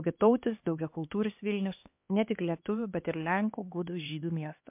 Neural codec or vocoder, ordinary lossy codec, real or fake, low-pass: codec, 16 kHz, 2 kbps, X-Codec, HuBERT features, trained on LibriSpeech; MP3, 32 kbps; fake; 3.6 kHz